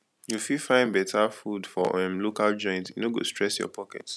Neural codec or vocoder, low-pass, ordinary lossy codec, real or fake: none; none; none; real